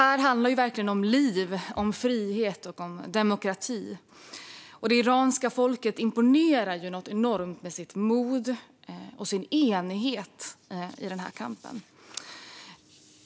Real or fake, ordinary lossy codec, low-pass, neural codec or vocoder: real; none; none; none